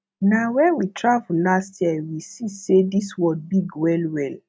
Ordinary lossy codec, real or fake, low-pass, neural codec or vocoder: none; real; none; none